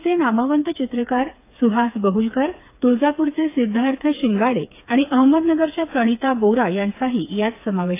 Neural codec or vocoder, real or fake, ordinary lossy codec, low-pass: codec, 16 kHz, 4 kbps, FreqCodec, smaller model; fake; AAC, 24 kbps; 3.6 kHz